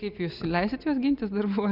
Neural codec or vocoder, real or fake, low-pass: none; real; 5.4 kHz